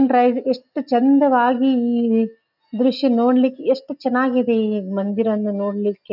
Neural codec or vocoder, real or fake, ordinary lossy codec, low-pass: none; real; none; 5.4 kHz